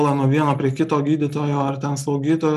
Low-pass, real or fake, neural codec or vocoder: 14.4 kHz; real; none